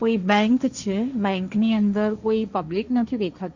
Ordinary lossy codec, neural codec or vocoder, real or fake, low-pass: Opus, 64 kbps; codec, 16 kHz, 1.1 kbps, Voila-Tokenizer; fake; 7.2 kHz